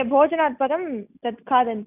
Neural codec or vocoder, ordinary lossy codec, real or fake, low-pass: none; none; real; 3.6 kHz